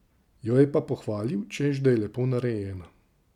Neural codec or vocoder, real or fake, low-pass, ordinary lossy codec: none; real; 19.8 kHz; none